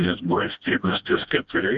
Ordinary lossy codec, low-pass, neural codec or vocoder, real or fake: Opus, 64 kbps; 7.2 kHz; codec, 16 kHz, 1 kbps, FreqCodec, smaller model; fake